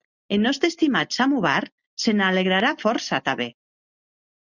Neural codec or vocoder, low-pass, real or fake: none; 7.2 kHz; real